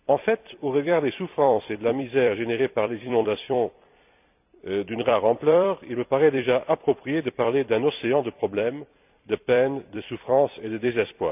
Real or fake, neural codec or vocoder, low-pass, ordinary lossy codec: fake; vocoder, 44.1 kHz, 128 mel bands every 256 samples, BigVGAN v2; 3.6 kHz; none